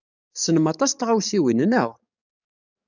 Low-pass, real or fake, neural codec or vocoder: 7.2 kHz; fake; codec, 44.1 kHz, 7.8 kbps, DAC